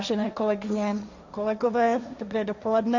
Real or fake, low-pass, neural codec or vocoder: fake; 7.2 kHz; codec, 16 kHz, 1.1 kbps, Voila-Tokenizer